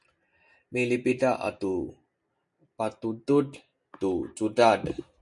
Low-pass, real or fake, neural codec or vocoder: 10.8 kHz; real; none